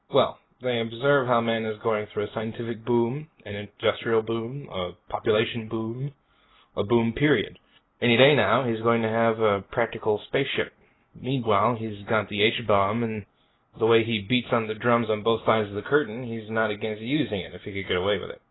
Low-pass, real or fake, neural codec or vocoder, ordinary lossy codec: 7.2 kHz; real; none; AAC, 16 kbps